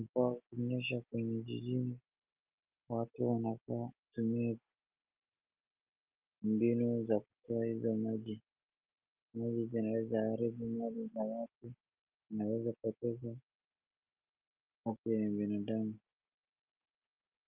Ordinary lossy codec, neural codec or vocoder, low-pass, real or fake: Opus, 32 kbps; none; 3.6 kHz; real